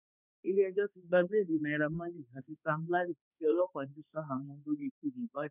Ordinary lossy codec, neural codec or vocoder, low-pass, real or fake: none; codec, 16 kHz, 2 kbps, X-Codec, HuBERT features, trained on balanced general audio; 3.6 kHz; fake